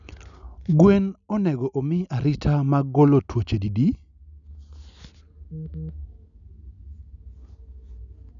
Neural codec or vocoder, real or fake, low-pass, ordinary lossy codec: none; real; 7.2 kHz; none